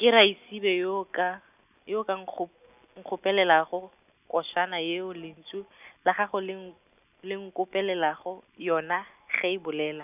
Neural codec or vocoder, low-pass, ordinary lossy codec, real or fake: none; 3.6 kHz; none; real